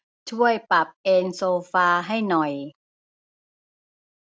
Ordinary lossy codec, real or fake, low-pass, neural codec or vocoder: none; real; none; none